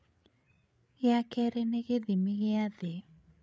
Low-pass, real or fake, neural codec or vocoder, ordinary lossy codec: none; fake; codec, 16 kHz, 8 kbps, FreqCodec, larger model; none